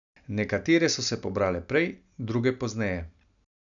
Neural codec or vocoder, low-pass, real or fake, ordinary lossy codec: none; 7.2 kHz; real; none